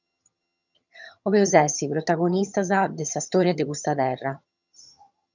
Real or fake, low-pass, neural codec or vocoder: fake; 7.2 kHz; vocoder, 22.05 kHz, 80 mel bands, HiFi-GAN